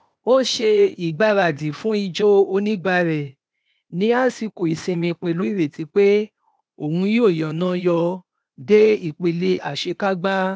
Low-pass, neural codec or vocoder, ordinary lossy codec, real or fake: none; codec, 16 kHz, 0.8 kbps, ZipCodec; none; fake